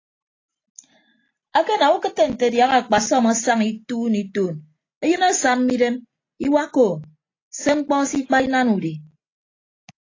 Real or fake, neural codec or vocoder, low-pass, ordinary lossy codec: real; none; 7.2 kHz; AAC, 32 kbps